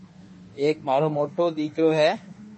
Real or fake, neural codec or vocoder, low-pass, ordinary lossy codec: fake; autoencoder, 48 kHz, 32 numbers a frame, DAC-VAE, trained on Japanese speech; 10.8 kHz; MP3, 32 kbps